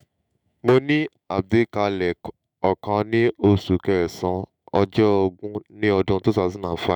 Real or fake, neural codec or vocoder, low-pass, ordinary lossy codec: fake; autoencoder, 48 kHz, 128 numbers a frame, DAC-VAE, trained on Japanese speech; 19.8 kHz; none